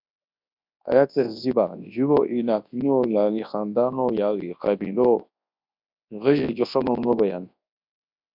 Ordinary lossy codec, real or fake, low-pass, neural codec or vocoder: MP3, 48 kbps; fake; 5.4 kHz; codec, 24 kHz, 0.9 kbps, WavTokenizer, large speech release